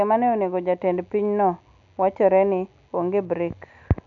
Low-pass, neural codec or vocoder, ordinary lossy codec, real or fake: 7.2 kHz; none; none; real